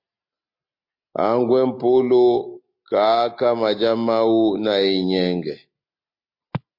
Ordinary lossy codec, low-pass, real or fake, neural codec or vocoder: MP3, 32 kbps; 5.4 kHz; real; none